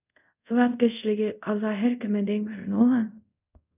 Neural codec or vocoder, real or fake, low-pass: codec, 24 kHz, 0.5 kbps, DualCodec; fake; 3.6 kHz